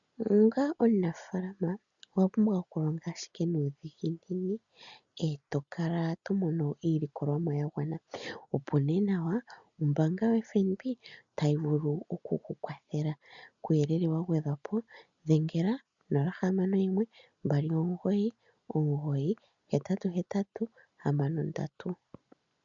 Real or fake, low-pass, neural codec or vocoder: real; 7.2 kHz; none